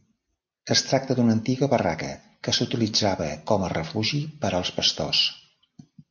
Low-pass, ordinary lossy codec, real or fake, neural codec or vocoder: 7.2 kHz; MP3, 48 kbps; real; none